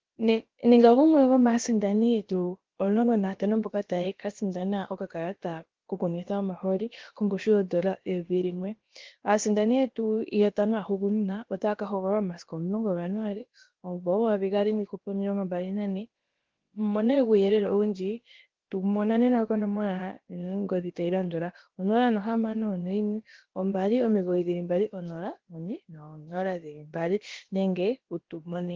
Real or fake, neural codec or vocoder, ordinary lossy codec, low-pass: fake; codec, 16 kHz, about 1 kbps, DyCAST, with the encoder's durations; Opus, 16 kbps; 7.2 kHz